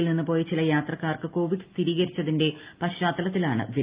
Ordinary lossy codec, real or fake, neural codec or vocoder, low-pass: Opus, 32 kbps; real; none; 3.6 kHz